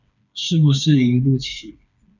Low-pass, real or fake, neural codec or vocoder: 7.2 kHz; fake; codec, 16 kHz, 4 kbps, FreqCodec, smaller model